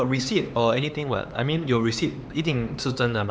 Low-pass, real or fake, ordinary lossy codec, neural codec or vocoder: none; fake; none; codec, 16 kHz, 4 kbps, X-Codec, HuBERT features, trained on LibriSpeech